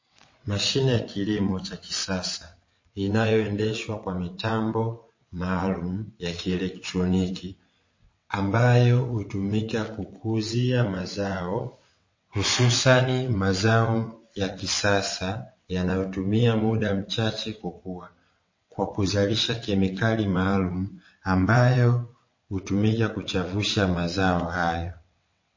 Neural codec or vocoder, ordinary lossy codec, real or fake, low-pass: vocoder, 22.05 kHz, 80 mel bands, WaveNeXt; MP3, 32 kbps; fake; 7.2 kHz